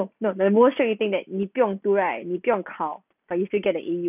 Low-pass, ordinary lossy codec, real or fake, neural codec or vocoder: 3.6 kHz; none; real; none